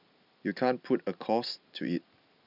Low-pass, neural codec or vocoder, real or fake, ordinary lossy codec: 5.4 kHz; none; real; none